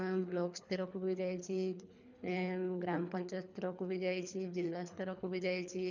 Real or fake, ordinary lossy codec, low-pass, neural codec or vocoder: fake; none; 7.2 kHz; codec, 24 kHz, 3 kbps, HILCodec